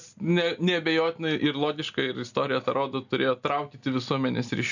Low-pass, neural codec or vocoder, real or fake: 7.2 kHz; none; real